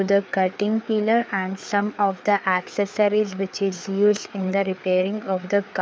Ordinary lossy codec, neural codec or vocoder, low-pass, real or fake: none; codec, 16 kHz, 4 kbps, FreqCodec, larger model; none; fake